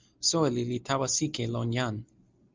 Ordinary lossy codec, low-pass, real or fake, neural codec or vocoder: Opus, 24 kbps; 7.2 kHz; real; none